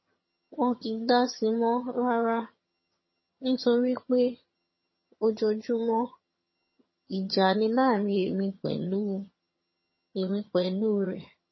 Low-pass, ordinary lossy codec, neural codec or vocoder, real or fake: 7.2 kHz; MP3, 24 kbps; vocoder, 22.05 kHz, 80 mel bands, HiFi-GAN; fake